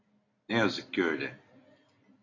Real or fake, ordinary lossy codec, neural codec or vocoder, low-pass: real; AAC, 32 kbps; none; 7.2 kHz